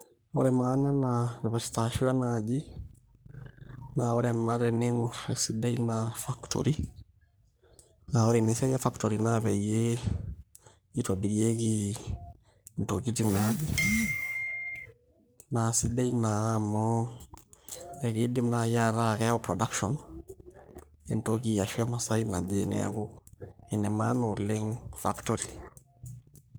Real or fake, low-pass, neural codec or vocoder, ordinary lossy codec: fake; none; codec, 44.1 kHz, 3.4 kbps, Pupu-Codec; none